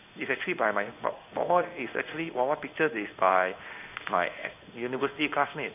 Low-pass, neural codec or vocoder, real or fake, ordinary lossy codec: 3.6 kHz; codec, 16 kHz in and 24 kHz out, 1 kbps, XY-Tokenizer; fake; none